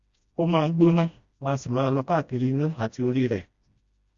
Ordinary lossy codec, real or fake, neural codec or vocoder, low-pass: Opus, 64 kbps; fake; codec, 16 kHz, 1 kbps, FreqCodec, smaller model; 7.2 kHz